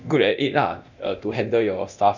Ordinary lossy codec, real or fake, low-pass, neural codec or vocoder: none; fake; 7.2 kHz; codec, 24 kHz, 0.9 kbps, DualCodec